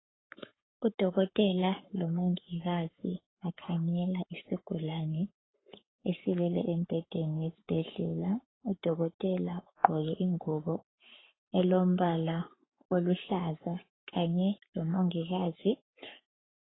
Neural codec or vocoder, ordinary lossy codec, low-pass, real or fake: codec, 44.1 kHz, 7.8 kbps, Pupu-Codec; AAC, 16 kbps; 7.2 kHz; fake